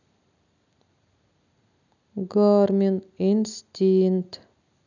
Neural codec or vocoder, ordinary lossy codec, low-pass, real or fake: none; none; 7.2 kHz; real